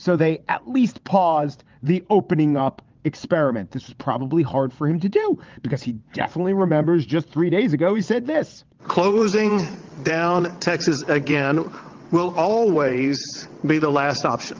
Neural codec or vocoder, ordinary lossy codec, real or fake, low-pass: vocoder, 22.05 kHz, 80 mel bands, WaveNeXt; Opus, 24 kbps; fake; 7.2 kHz